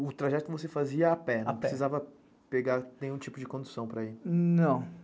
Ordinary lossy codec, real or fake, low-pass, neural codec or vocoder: none; real; none; none